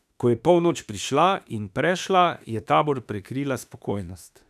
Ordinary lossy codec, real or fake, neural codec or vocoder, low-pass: none; fake; autoencoder, 48 kHz, 32 numbers a frame, DAC-VAE, trained on Japanese speech; 14.4 kHz